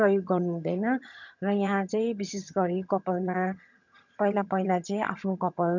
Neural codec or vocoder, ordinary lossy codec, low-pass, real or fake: vocoder, 22.05 kHz, 80 mel bands, HiFi-GAN; none; 7.2 kHz; fake